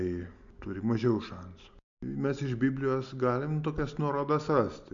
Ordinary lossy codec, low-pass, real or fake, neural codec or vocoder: MP3, 64 kbps; 7.2 kHz; real; none